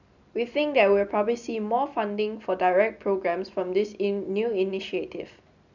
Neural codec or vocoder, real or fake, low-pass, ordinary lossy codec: none; real; 7.2 kHz; none